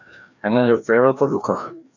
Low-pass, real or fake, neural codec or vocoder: 7.2 kHz; fake; codec, 16 kHz, 1 kbps, FreqCodec, larger model